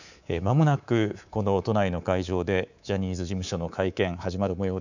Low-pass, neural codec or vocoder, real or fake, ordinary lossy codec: 7.2 kHz; codec, 24 kHz, 3.1 kbps, DualCodec; fake; none